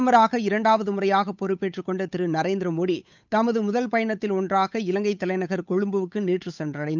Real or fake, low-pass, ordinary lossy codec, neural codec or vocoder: fake; 7.2 kHz; none; codec, 16 kHz, 8 kbps, FunCodec, trained on Chinese and English, 25 frames a second